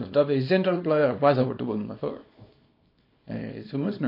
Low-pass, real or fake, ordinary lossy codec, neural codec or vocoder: 5.4 kHz; fake; MP3, 32 kbps; codec, 24 kHz, 0.9 kbps, WavTokenizer, small release